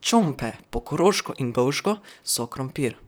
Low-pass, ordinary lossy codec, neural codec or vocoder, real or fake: none; none; vocoder, 44.1 kHz, 128 mel bands, Pupu-Vocoder; fake